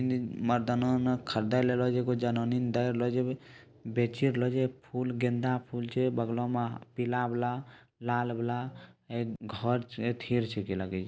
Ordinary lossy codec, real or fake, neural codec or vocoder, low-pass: none; real; none; none